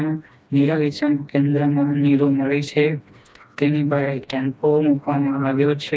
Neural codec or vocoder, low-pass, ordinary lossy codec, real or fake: codec, 16 kHz, 1 kbps, FreqCodec, smaller model; none; none; fake